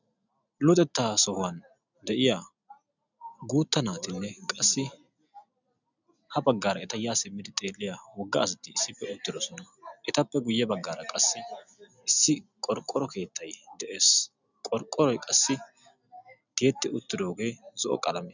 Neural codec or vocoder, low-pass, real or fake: none; 7.2 kHz; real